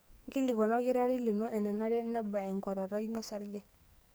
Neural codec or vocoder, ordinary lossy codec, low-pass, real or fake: codec, 44.1 kHz, 2.6 kbps, SNAC; none; none; fake